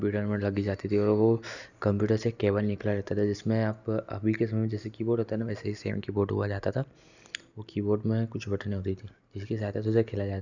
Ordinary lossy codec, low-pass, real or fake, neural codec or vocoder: none; 7.2 kHz; real; none